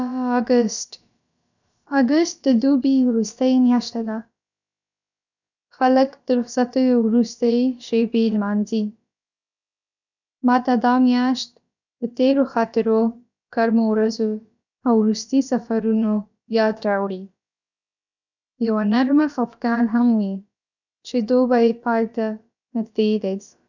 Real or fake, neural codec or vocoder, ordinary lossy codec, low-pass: fake; codec, 16 kHz, about 1 kbps, DyCAST, with the encoder's durations; none; 7.2 kHz